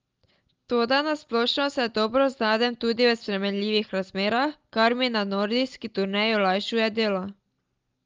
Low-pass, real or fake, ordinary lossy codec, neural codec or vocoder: 7.2 kHz; real; Opus, 32 kbps; none